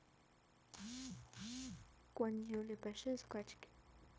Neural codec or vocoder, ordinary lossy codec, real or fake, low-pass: codec, 16 kHz, 0.9 kbps, LongCat-Audio-Codec; none; fake; none